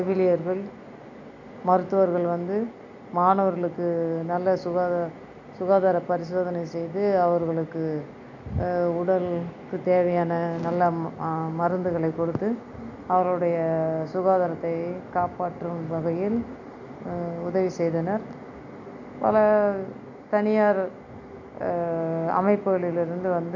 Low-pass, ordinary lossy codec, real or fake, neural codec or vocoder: 7.2 kHz; none; real; none